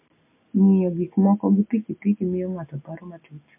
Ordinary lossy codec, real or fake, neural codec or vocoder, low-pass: MP3, 24 kbps; real; none; 3.6 kHz